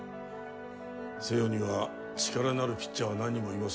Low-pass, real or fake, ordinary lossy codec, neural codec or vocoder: none; real; none; none